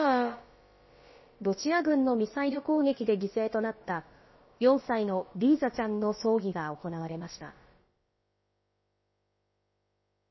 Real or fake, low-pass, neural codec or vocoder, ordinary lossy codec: fake; 7.2 kHz; codec, 16 kHz, about 1 kbps, DyCAST, with the encoder's durations; MP3, 24 kbps